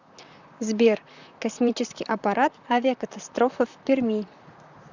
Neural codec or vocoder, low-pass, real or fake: vocoder, 44.1 kHz, 128 mel bands, Pupu-Vocoder; 7.2 kHz; fake